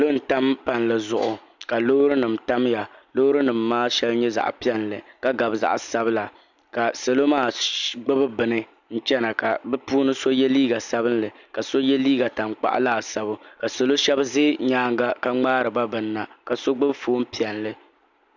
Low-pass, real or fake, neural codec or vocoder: 7.2 kHz; real; none